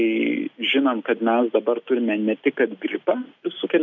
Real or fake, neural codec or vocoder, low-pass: real; none; 7.2 kHz